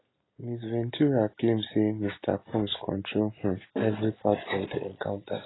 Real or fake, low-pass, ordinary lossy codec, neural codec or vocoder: real; 7.2 kHz; AAC, 16 kbps; none